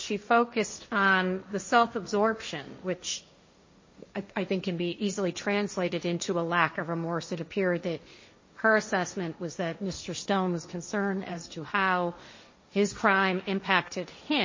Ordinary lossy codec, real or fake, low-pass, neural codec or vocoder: MP3, 32 kbps; fake; 7.2 kHz; codec, 16 kHz, 1.1 kbps, Voila-Tokenizer